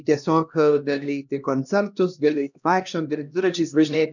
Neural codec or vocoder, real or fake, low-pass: codec, 16 kHz, 1 kbps, X-Codec, HuBERT features, trained on LibriSpeech; fake; 7.2 kHz